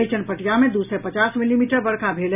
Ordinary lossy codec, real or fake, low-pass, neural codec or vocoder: none; real; 3.6 kHz; none